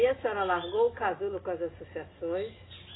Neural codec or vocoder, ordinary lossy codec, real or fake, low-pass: none; AAC, 16 kbps; real; 7.2 kHz